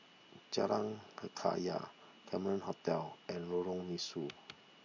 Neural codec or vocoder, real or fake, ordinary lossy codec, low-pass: none; real; MP3, 48 kbps; 7.2 kHz